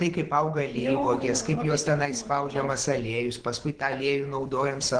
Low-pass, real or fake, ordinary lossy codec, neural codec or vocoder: 9.9 kHz; fake; Opus, 16 kbps; codec, 24 kHz, 6 kbps, HILCodec